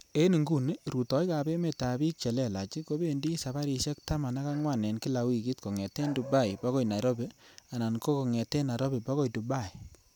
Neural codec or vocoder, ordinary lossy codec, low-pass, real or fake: none; none; none; real